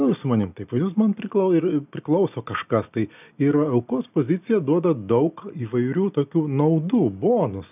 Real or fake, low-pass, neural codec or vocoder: real; 3.6 kHz; none